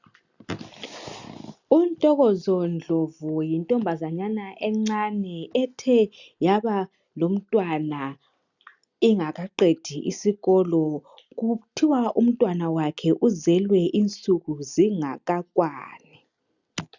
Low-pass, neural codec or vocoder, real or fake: 7.2 kHz; none; real